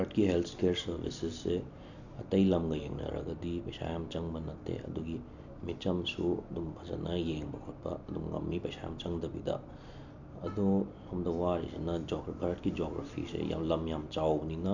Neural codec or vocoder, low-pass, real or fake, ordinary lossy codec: none; 7.2 kHz; real; none